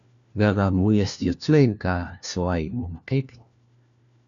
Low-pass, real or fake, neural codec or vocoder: 7.2 kHz; fake; codec, 16 kHz, 1 kbps, FunCodec, trained on LibriTTS, 50 frames a second